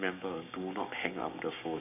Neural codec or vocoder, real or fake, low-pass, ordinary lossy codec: codec, 16 kHz, 6 kbps, DAC; fake; 3.6 kHz; none